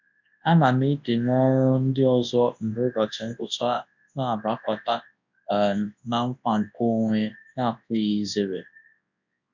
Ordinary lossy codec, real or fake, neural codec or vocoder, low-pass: MP3, 64 kbps; fake; codec, 24 kHz, 0.9 kbps, WavTokenizer, large speech release; 7.2 kHz